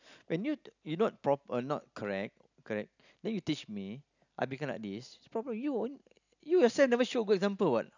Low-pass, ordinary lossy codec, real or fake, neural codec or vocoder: 7.2 kHz; none; real; none